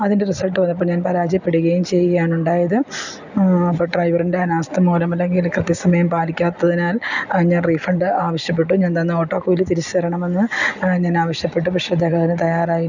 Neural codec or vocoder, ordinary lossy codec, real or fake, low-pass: none; none; real; 7.2 kHz